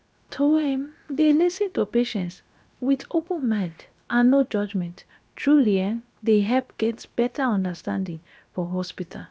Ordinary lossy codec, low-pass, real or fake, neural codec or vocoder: none; none; fake; codec, 16 kHz, about 1 kbps, DyCAST, with the encoder's durations